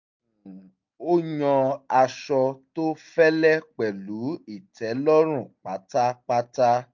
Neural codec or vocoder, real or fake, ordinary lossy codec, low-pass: none; real; MP3, 48 kbps; 7.2 kHz